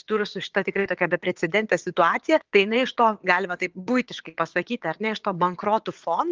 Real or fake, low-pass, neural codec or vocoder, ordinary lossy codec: fake; 7.2 kHz; vocoder, 22.05 kHz, 80 mel bands, WaveNeXt; Opus, 32 kbps